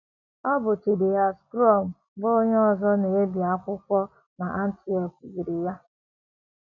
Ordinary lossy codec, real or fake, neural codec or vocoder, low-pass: none; real; none; 7.2 kHz